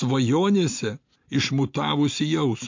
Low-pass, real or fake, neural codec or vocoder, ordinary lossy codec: 7.2 kHz; real; none; MP3, 48 kbps